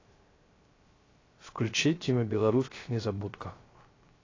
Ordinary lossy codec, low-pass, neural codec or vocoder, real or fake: AAC, 32 kbps; 7.2 kHz; codec, 16 kHz, 0.3 kbps, FocalCodec; fake